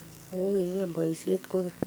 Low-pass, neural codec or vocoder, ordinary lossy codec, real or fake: none; codec, 44.1 kHz, 2.6 kbps, SNAC; none; fake